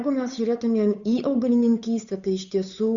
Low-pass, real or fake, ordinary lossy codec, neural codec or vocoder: 7.2 kHz; fake; Opus, 64 kbps; codec, 16 kHz, 8 kbps, FunCodec, trained on Chinese and English, 25 frames a second